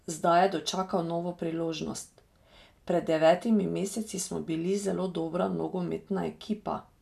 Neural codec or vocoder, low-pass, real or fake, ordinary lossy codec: none; 14.4 kHz; real; none